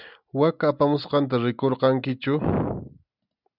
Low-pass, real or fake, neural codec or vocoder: 5.4 kHz; real; none